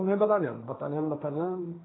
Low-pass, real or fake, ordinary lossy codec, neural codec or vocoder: 7.2 kHz; fake; AAC, 16 kbps; codec, 16 kHz, 1.1 kbps, Voila-Tokenizer